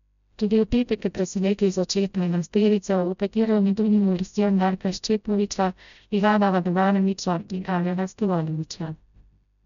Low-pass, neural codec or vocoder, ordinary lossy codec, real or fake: 7.2 kHz; codec, 16 kHz, 0.5 kbps, FreqCodec, smaller model; none; fake